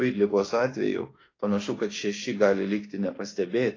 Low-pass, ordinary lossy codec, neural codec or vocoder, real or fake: 7.2 kHz; AAC, 32 kbps; autoencoder, 48 kHz, 32 numbers a frame, DAC-VAE, trained on Japanese speech; fake